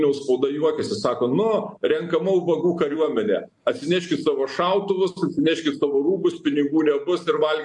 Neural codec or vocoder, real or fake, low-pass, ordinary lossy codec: none; real; 10.8 kHz; MP3, 64 kbps